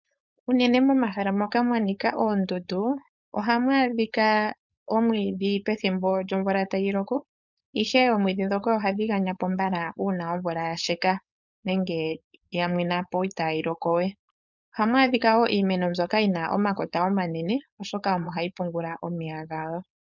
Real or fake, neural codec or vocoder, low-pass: fake; codec, 16 kHz, 4.8 kbps, FACodec; 7.2 kHz